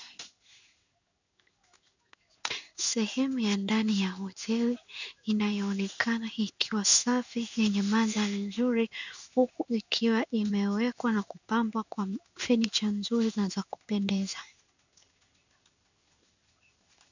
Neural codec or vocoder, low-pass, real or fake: codec, 16 kHz in and 24 kHz out, 1 kbps, XY-Tokenizer; 7.2 kHz; fake